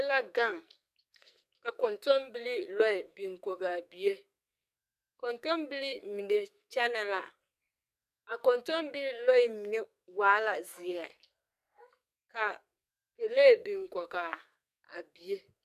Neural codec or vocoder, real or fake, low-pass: codec, 44.1 kHz, 2.6 kbps, SNAC; fake; 14.4 kHz